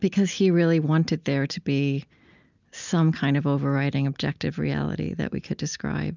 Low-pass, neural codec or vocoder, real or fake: 7.2 kHz; none; real